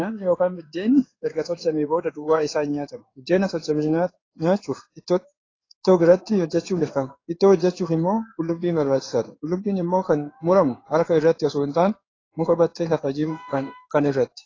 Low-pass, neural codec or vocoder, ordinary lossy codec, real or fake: 7.2 kHz; codec, 16 kHz in and 24 kHz out, 1 kbps, XY-Tokenizer; AAC, 32 kbps; fake